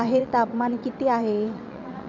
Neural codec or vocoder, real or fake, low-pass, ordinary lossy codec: vocoder, 22.05 kHz, 80 mel bands, WaveNeXt; fake; 7.2 kHz; MP3, 64 kbps